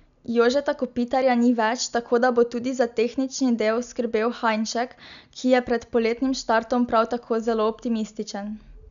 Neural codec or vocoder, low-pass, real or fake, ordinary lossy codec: none; 7.2 kHz; real; none